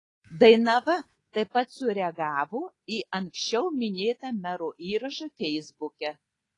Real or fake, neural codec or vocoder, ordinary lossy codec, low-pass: fake; codec, 24 kHz, 3.1 kbps, DualCodec; AAC, 32 kbps; 10.8 kHz